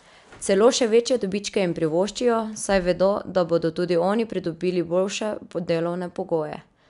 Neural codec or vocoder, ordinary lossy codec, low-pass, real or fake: none; none; 10.8 kHz; real